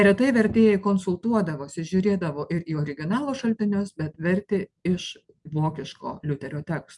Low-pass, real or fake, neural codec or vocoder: 10.8 kHz; real; none